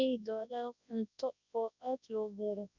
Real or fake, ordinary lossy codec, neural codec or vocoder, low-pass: fake; none; codec, 24 kHz, 0.9 kbps, WavTokenizer, large speech release; 7.2 kHz